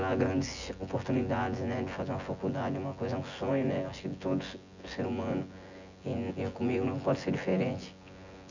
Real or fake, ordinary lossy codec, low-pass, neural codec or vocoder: fake; none; 7.2 kHz; vocoder, 24 kHz, 100 mel bands, Vocos